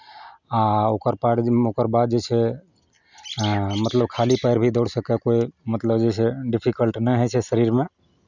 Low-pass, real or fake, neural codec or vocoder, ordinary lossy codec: 7.2 kHz; real; none; none